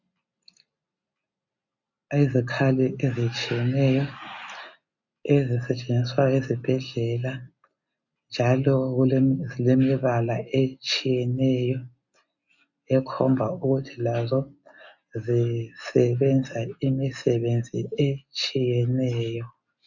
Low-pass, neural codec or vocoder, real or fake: 7.2 kHz; none; real